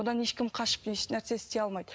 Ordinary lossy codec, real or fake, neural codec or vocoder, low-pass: none; real; none; none